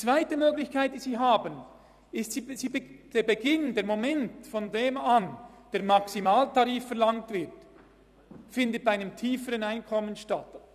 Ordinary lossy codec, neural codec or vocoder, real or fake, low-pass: none; vocoder, 44.1 kHz, 128 mel bands every 256 samples, BigVGAN v2; fake; 14.4 kHz